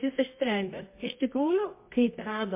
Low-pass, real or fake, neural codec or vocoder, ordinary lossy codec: 3.6 kHz; fake; codec, 24 kHz, 0.9 kbps, WavTokenizer, medium music audio release; MP3, 24 kbps